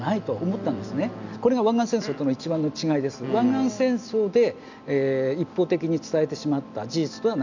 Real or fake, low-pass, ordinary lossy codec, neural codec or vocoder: real; 7.2 kHz; none; none